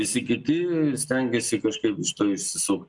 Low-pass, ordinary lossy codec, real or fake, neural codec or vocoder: 10.8 kHz; MP3, 96 kbps; real; none